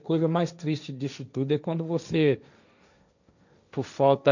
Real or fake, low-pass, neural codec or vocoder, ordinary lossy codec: fake; 7.2 kHz; codec, 16 kHz, 1.1 kbps, Voila-Tokenizer; none